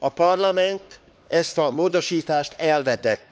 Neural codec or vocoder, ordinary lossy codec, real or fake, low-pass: codec, 16 kHz, 2 kbps, X-Codec, HuBERT features, trained on LibriSpeech; none; fake; none